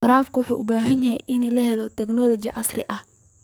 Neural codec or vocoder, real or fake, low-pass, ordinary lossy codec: codec, 44.1 kHz, 2.6 kbps, SNAC; fake; none; none